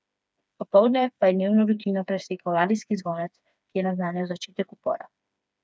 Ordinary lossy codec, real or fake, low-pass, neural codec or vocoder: none; fake; none; codec, 16 kHz, 4 kbps, FreqCodec, smaller model